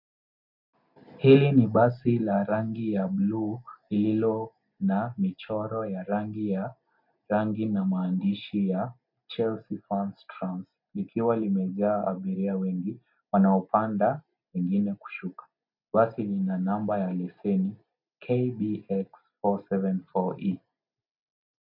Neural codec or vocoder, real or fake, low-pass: none; real; 5.4 kHz